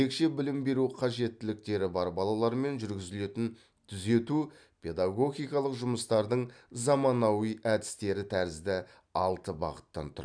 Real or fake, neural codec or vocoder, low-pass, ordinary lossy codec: real; none; 9.9 kHz; none